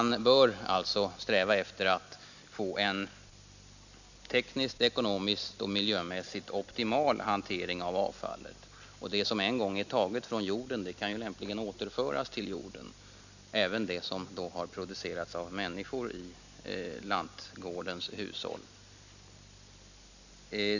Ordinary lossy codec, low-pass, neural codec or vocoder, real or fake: none; 7.2 kHz; none; real